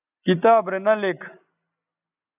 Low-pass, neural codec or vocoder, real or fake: 3.6 kHz; none; real